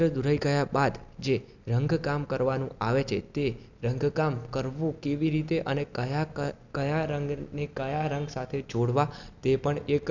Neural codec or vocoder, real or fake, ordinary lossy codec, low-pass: none; real; none; 7.2 kHz